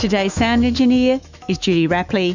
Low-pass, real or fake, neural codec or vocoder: 7.2 kHz; fake; autoencoder, 48 kHz, 128 numbers a frame, DAC-VAE, trained on Japanese speech